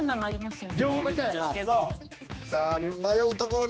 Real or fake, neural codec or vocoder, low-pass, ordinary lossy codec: fake; codec, 16 kHz, 2 kbps, X-Codec, HuBERT features, trained on general audio; none; none